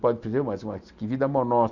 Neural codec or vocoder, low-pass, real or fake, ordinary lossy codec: none; 7.2 kHz; real; none